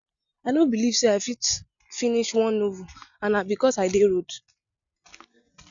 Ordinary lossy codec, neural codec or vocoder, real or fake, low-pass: none; none; real; 7.2 kHz